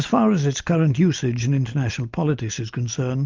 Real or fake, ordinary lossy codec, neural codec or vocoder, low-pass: real; Opus, 24 kbps; none; 7.2 kHz